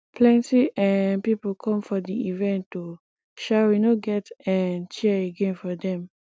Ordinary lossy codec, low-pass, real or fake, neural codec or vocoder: none; none; real; none